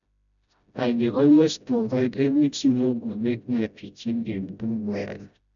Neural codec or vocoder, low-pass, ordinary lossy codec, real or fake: codec, 16 kHz, 0.5 kbps, FreqCodec, smaller model; 7.2 kHz; none; fake